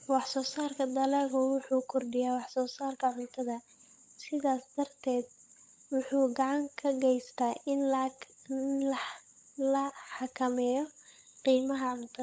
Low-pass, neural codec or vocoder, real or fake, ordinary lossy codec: none; codec, 16 kHz, 16 kbps, FunCodec, trained on LibriTTS, 50 frames a second; fake; none